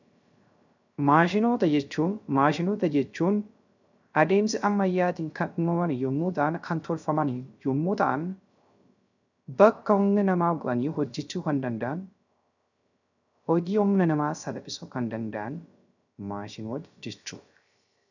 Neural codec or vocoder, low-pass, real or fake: codec, 16 kHz, 0.3 kbps, FocalCodec; 7.2 kHz; fake